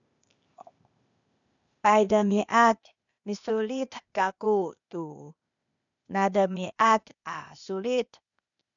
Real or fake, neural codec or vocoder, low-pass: fake; codec, 16 kHz, 0.8 kbps, ZipCodec; 7.2 kHz